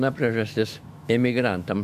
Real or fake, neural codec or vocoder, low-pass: fake; autoencoder, 48 kHz, 128 numbers a frame, DAC-VAE, trained on Japanese speech; 14.4 kHz